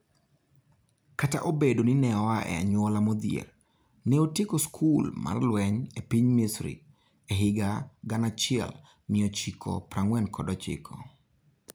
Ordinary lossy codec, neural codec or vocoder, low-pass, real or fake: none; none; none; real